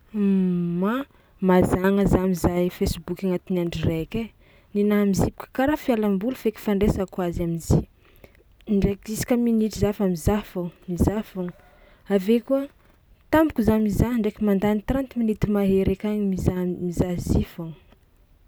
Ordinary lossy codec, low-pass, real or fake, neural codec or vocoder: none; none; real; none